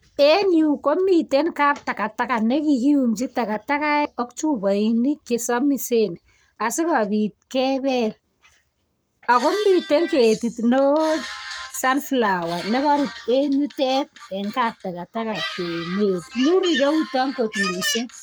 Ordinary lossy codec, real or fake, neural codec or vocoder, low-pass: none; fake; codec, 44.1 kHz, 7.8 kbps, Pupu-Codec; none